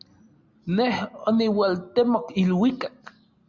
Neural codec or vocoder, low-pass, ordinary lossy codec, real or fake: none; 7.2 kHz; Opus, 64 kbps; real